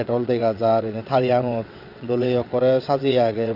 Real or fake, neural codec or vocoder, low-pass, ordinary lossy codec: fake; vocoder, 22.05 kHz, 80 mel bands, WaveNeXt; 5.4 kHz; Opus, 64 kbps